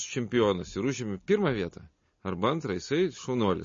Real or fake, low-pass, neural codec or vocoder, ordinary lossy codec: real; 7.2 kHz; none; MP3, 32 kbps